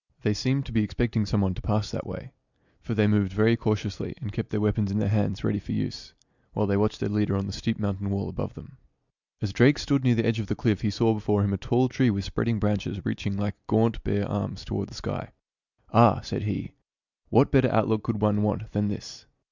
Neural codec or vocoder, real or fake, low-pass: none; real; 7.2 kHz